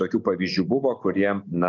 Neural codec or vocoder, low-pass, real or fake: none; 7.2 kHz; real